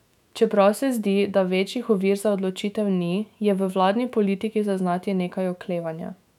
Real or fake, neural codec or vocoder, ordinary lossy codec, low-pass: fake; autoencoder, 48 kHz, 128 numbers a frame, DAC-VAE, trained on Japanese speech; none; 19.8 kHz